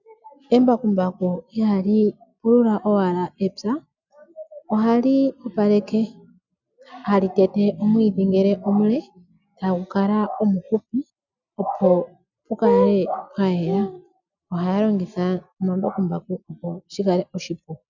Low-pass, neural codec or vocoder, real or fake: 7.2 kHz; none; real